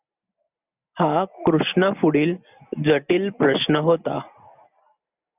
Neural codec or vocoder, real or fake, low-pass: none; real; 3.6 kHz